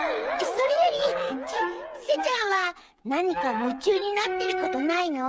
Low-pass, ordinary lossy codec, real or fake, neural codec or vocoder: none; none; fake; codec, 16 kHz, 8 kbps, FreqCodec, smaller model